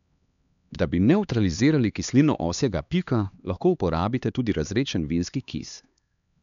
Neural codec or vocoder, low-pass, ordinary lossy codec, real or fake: codec, 16 kHz, 2 kbps, X-Codec, HuBERT features, trained on LibriSpeech; 7.2 kHz; none; fake